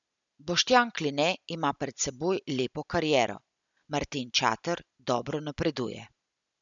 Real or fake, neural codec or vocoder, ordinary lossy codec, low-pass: real; none; MP3, 96 kbps; 7.2 kHz